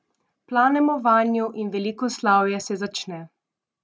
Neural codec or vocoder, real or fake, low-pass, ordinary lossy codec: none; real; none; none